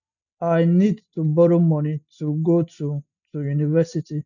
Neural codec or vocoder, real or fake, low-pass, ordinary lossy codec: none; real; 7.2 kHz; none